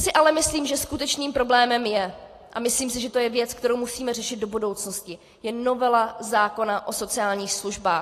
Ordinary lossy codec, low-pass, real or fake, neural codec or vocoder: AAC, 48 kbps; 14.4 kHz; real; none